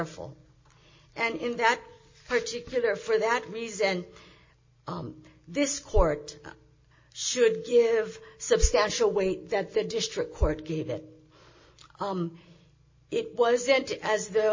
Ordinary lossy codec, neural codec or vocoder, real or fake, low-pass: MP3, 32 kbps; none; real; 7.2 kHz